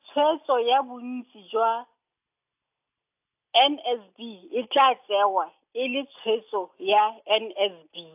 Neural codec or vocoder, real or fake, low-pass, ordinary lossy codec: none; real; 3.6 kHz; AAC, 32 kbps